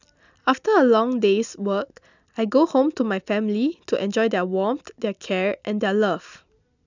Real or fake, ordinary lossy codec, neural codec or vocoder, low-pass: real; none; none; 7.2 kHz